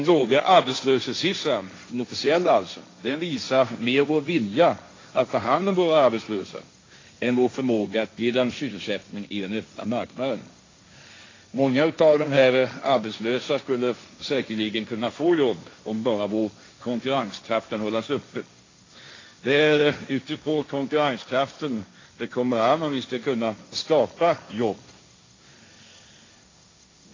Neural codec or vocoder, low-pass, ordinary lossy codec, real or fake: codec, 16 kHz, 1.1 kbps, Voila-Tokenizer; 7.2 kHz; AAC, 32 kbps; fake